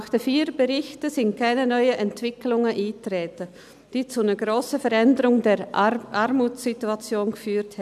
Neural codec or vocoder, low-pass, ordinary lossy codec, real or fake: none; 14.4 kHz; none; real